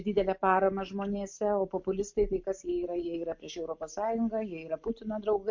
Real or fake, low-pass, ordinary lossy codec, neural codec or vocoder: real; 7.2 kHz; MP3, 48 kbps; none